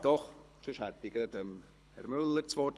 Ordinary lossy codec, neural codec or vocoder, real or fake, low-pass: none; codec, 24 kHz, 6 kbps, HILCodec; fake; none